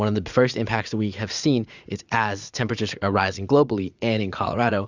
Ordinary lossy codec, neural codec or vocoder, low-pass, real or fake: Opus, 64 kbps; vocoder, 44.1 kHz, 80 mel bands, Vocos; 7.2 kHz; fake